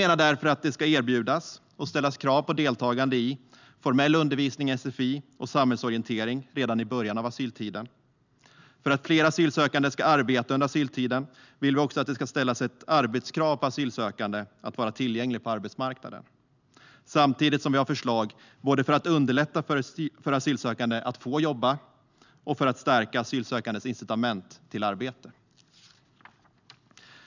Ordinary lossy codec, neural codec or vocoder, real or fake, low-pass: none; none; real; 7.2 kHz